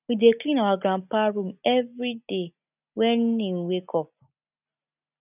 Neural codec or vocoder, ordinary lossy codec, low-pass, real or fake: none; none; 3.6 kHz; real